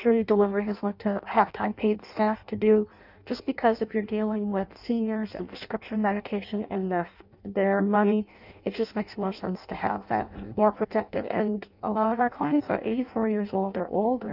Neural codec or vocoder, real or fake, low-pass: codec, 16 kHz in and 24 kHz out, 0.6 kbps, FireRedTTS-2 codec; fake; 5.4 kHz